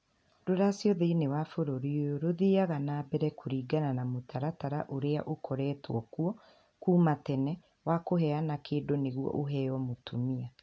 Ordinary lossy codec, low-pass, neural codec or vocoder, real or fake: none; none; none; real